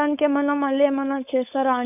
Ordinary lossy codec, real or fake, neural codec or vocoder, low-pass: none; fake; codec, 16 kHz, 4.8 kbps, FACodec; 3.6 kHz